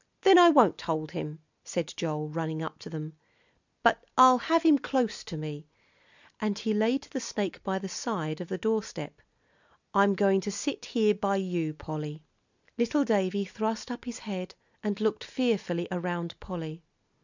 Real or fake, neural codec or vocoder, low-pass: real; none; 7.2 kHz